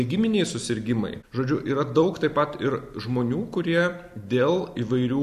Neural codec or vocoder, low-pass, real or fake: none; 14.4 kHz; real